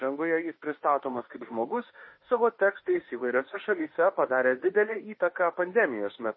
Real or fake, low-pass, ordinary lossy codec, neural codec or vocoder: fake; 7.2 kHz; MP3, 24 kbps; autoencoder, 48 kHz, 32 numbers a frame, DAC-VAE, trained on Japanese speech